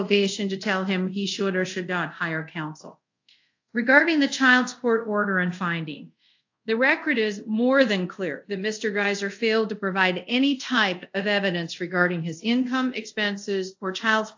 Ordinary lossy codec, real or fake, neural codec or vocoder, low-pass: AAC, 48 kbps; fake; codec, 24 kHz, 0.5 kbps, DualCodec; 7.2 kHz